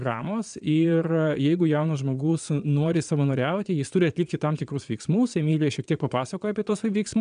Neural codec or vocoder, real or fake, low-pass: vocoder, 22.05 kHz, 80 mel bands, Vocos; fake; 9.9 kHz